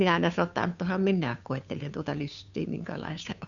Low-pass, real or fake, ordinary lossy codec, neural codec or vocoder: 7.2 kHz; fake; none; codec, 16 kHz, 2 kbps, FunCodec, trained on Chinese and English, 25 frames a second